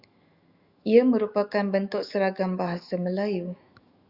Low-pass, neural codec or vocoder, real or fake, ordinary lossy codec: 5.4 kHz; autoencoder, 48 kHz, 128 numbers a frame, DAC-VAE, trained on Japanese speech; fake; Opus, 64 kbps